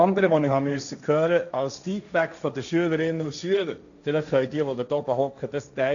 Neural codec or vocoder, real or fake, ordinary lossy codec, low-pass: codec, 16 kHz, 1.1 kbps, Voila-Tokenizer; fake; none; 7.2 kHz